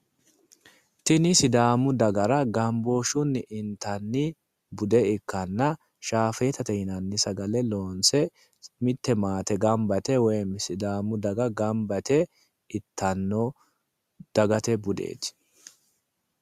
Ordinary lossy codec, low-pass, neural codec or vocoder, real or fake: Opus, 64 kbps; 14.4 kHz; none; real